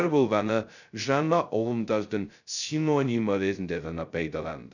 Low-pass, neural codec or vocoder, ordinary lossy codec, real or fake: 7.2 kHz; codec, 16 kHz, 0.2 kbps, FocalCodec; none; fake